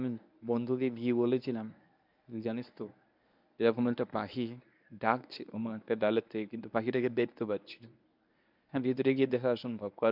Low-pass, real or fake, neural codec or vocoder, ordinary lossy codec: 5.4 kHz; fake; codec, 24 kHz, 0.9 kbps, WavTokenizer, medium speech release version 1; none